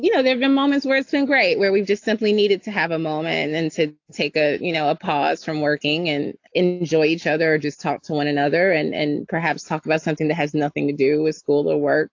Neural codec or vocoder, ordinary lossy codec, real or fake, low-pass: none; AAC, 48 kbps; real; 7.2 kHz